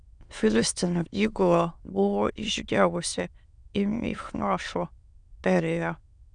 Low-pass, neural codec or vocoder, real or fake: 9.9 kHz; autoencoder, 22.05 kHz, a latent of 192 numbers a frame, VITS, trained on many speakers; fake